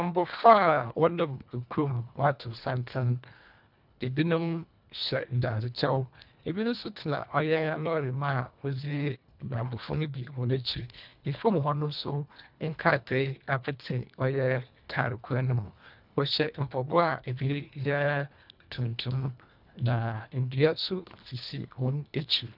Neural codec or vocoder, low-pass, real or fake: codec, 24 kHz, 1.5 kbps, HILCodec; 5.4 kHz; fake